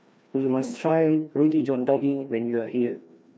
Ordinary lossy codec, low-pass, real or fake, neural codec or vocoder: none; none; fake; codec, 16 kHz, 1 kbps, FreqCodec, larger model